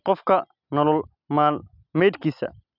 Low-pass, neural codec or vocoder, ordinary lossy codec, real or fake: 5.4 kHz; none; none; real